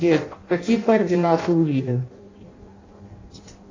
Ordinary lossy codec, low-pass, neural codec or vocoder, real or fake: AAC, 32 kbps; 7.2 kHz; codec, 16 kHz in and 24 kHz out, 0.6 kbps, FireRedTTS-2 codec; fake